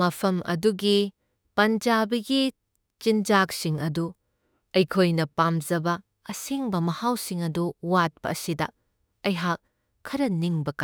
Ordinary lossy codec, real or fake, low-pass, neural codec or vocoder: none; fake; none; autoencoder, 48 kHz, 32 numbers a frame, DAC-VAE, trained on Japanese speech